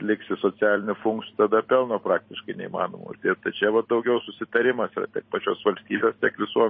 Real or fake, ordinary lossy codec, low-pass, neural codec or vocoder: real; MP3, 24 kbps; 7.2 kHz; none